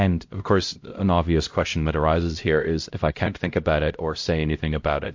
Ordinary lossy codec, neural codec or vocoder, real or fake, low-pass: MP3, 48 kbps; codec, 16 kHz, 0.5 kbps, X-Codec, HuBERT features, trained on LibriSpeech; fake; 7.2 kHz